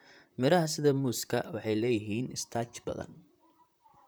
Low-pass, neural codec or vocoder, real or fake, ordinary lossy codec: none; vocoder, 44.1 kHz, 128 mel bands, Pupu-Vocoder; fake; none